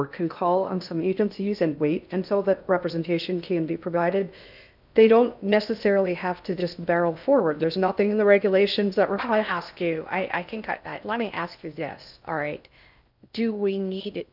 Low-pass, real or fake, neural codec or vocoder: 5.4 kHz; fake; codec, 16 kHz in and 24 kHz out, 0.6 kbps, FocalCodec, streaming, 2048 codes